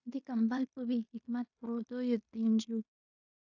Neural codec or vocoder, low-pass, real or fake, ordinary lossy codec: codec, 16 kHz in and 24 kHz out, 0.9 kbps, LongCat-Audio-Codec, fine tuned four codebook decoder; 7.2 kHz; fake; AAC, 48 kbps